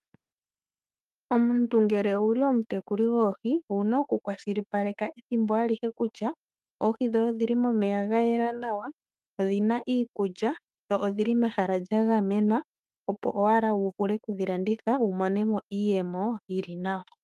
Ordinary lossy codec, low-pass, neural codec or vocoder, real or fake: Opus, 32 kbps; 14.4 kHz; autoencoder, 48 kHz, 32 numbers a frame, DAC-VAE, trained on Japanese speech; fake